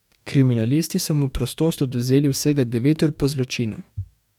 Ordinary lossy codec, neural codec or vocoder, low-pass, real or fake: none; codec, 44.1 kHz, 2.6 kbps, DAC; 19.8 kHz; fake